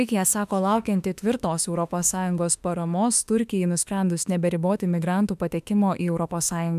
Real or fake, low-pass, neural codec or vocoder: fake; 14.4 kHz; autoencoder, 48 kHz, 32 numbers a frame, DAC-VAE, trained on Japanese speech